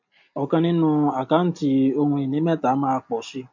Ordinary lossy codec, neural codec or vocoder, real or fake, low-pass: MP3, 48 kbps; none; real; 7.2 kHz